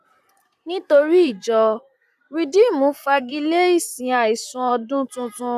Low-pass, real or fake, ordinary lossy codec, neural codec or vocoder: 14.4 kHz; fake; none; vocoder, 44.1 kHz, 128 mel bands, Pupu-Vocoder